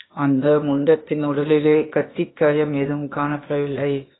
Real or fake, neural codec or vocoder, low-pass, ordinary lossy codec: fake; codec, 16 kHz, 0.8 kbps, ZipCodec; 7.2 kHz; AAC, 16 kbps